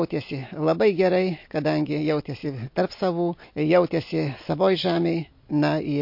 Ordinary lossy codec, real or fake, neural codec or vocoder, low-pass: MP3, 32 kbps; real; none; 5.4 kHz